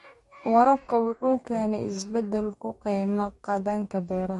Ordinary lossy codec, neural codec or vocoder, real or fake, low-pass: MP3, 48 kbps; codec, 44.1 kHz, 2.6 kbps, DAC; fake; 14.4 kHz